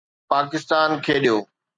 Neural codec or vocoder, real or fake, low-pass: none; real; 9.9 kHz